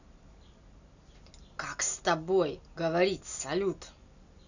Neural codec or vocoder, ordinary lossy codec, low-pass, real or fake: none; none; 7.2 kHz; real